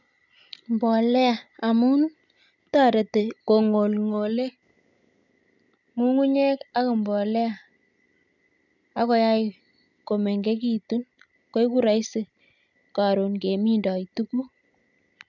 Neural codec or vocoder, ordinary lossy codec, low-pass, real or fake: none; none; 7.2 kHz; real